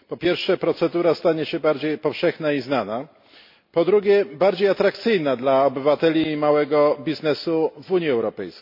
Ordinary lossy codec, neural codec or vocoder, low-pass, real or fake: MP3, 32 kbps; none; 5.4 kHz; real